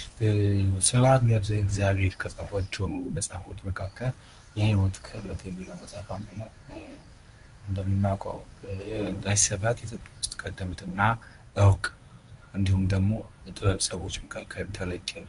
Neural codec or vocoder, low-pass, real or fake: codec, 24 kHz, 0.9 kbps, WavTokenizer, medium speech release version 1; 10.8 kHz; fake